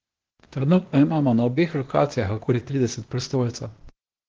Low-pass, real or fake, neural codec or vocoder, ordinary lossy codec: 7.2 kHz; fake; codec, 16 kHz, 0.8 kbps, ZipCodec; Opus, 16 kbps